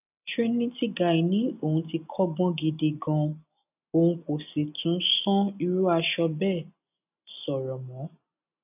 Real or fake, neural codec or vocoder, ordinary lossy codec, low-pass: real; none; none; 3.6 kHz